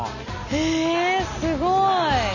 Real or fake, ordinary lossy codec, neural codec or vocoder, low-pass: real; none; none; 7.2 kHz